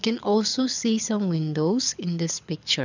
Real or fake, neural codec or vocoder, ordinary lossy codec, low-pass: fake; codec, 24 kHz, 6 kbps, HILCodec; none; 7.2 kHz